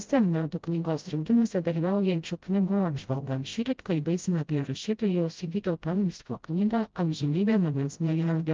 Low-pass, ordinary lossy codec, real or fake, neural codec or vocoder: 7.2 kHz; Opus, 24 kbps; fake; codec, 16 kHz, 0.5 kbps, FreqCodec, smaller model